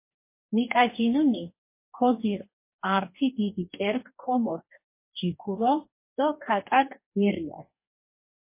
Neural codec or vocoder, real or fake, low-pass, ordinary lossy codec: codec, 44.1 kHz, 2.6 kbps, DAC; fake; 3.6 kHz; MP3, 24 kbps